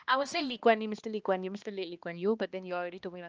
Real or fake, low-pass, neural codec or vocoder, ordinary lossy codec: fake; 7.2 kHz; codec, 16 kHz, 2 kbps, X-Codec, HuBERT features, trained on balanced general audio; Opus, 24 kbps